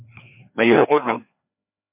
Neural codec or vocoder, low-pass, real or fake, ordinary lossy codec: codec, 16 kHz, 2 kbps, FreqCodec, larger model; 3.6 kHz; fake; MP3, 32 kbps